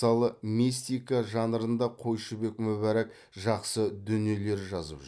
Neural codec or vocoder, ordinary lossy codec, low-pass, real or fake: none; none; none; real